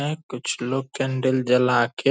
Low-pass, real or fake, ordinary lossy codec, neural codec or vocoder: none; real; none; none